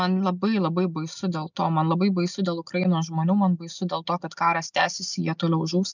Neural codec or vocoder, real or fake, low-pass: none; real; 7.2 kHz